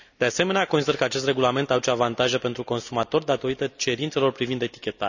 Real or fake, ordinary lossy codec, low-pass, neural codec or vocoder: real; none; 7.2 kHz; none